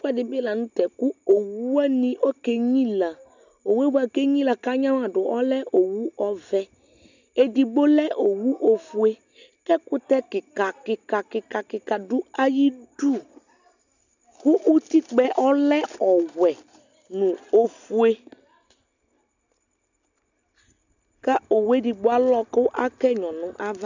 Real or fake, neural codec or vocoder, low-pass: real; none; 7.2 kHz